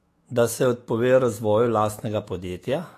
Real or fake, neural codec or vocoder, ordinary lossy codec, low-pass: fake; autoencoder, 48 kHz, 128 numbers a frame, DAC-VAE, trained on Japanese speech; AAC, 48 kbps; 14.4 kHz